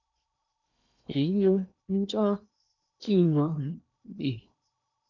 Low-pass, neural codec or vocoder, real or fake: 7.2 kHz; codec, 16 kHz in and 24 kHz out, 0.8 kbps, FocalCodec, streaming, 65536 codes; fake